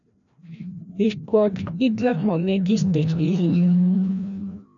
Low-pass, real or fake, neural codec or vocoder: 7.2 kHz; fake; codec, 16 kHz, 1 kbps, FreqCodec, larger model